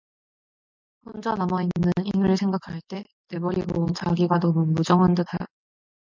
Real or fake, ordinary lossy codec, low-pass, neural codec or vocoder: real; MP3, 64 kbps; 7.2 kHz; none